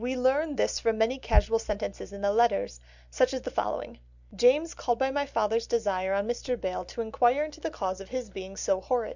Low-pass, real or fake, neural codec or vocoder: 7.2 kHz; real; none